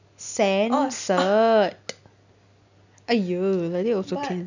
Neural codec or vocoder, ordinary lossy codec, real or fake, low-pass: none; none; real; 7.2 kHz